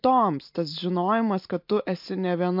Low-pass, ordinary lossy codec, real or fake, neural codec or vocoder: 5.4 kHz; MP3, 48 kbps; real; none